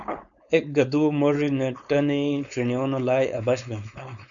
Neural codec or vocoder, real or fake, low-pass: codec, 16 kHz, 4.8 kbps, FACodec; fake; 7.2 kHz